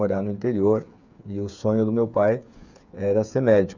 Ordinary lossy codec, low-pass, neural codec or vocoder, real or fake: none; 7.2 kHz; codec, 16 kHz, 8 kbps, FreqCodec, smaller model; fake